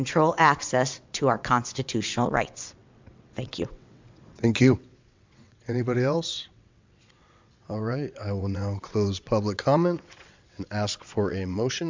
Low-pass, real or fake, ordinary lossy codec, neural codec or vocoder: 7.2 kHz; fake; MP3, 64 kbps; vocoder, 22.05 kHz, 80 mel bands, WaveNeXt